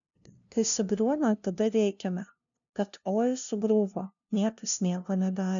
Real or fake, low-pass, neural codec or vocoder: fake; 7.2 kHz; codec, 16 kHz, 0.5 kbps, FunCodec, trained on LibriTTS, 25 frames a second